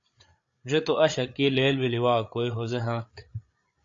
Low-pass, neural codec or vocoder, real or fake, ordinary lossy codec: 7.2 kHz; codec, 16 kHz, 8 kbps, FreqCodec, larger model; fake; AAC, 48 kbps